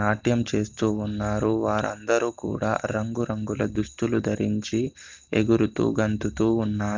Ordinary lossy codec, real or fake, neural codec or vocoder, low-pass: Opus, 32 kbps; real; none; 7.2 kHz